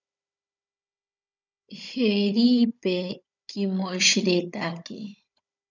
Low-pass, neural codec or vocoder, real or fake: 7.2 kHz; codec, 16 kHz, 16 kbps, FunCodec, trained on Chinese and English, 50 frames a second; fake